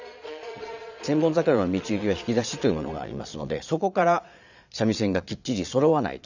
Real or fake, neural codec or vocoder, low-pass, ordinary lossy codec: fake; vocoder, 22.05 kHz, 80 mel bands, Vocos; 7.2 kHz; none